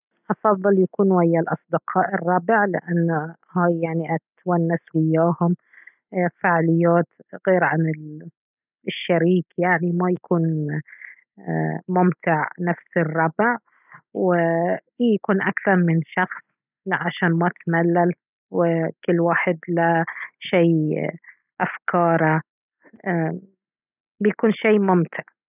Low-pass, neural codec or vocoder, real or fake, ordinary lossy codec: 3.6 kHz; none; real; none